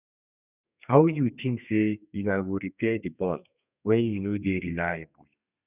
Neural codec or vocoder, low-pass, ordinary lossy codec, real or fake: codec, 32 kHz, 1.9 kbps, SNAC; 3.6 kHz; none; fake